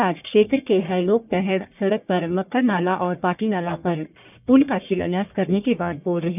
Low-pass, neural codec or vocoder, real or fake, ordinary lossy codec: 3.6 kHz; codec, 44.1 kHz, 1.7 kbps, Pupu-Codec; fake; none